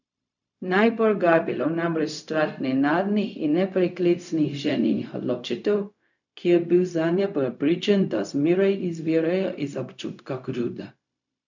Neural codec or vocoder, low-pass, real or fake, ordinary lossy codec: codec, 16 kHz, 0.4 kbps, LongCat-Audio-Codec; 7.2 kHz; fake; none